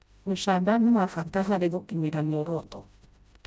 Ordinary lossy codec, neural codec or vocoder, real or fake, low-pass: none; codec, 16 kHz, 0.5 kbps, FreqCodec, smaller model; fake; none